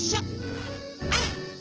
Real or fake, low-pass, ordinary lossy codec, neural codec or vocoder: fake; 7.2 kHz; Opus, 16 kbps; vocoder, 24 kHz, 100 mel bands, Vocos